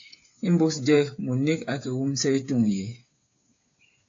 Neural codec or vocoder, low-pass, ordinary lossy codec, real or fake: codec, 16 kHz, 8 kbps, FreqCodec, smaller model; 7.2 kHz; AAC, 48 kbps; fake